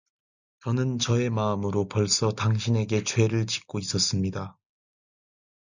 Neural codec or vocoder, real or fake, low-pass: none; real; 7.2 kHz